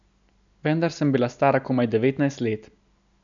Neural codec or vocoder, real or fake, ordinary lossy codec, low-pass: none; real; none; 7.2 kHz